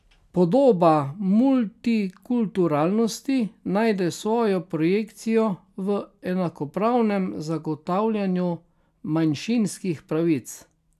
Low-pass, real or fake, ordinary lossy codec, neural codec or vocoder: 14.4 kHz; real; none; none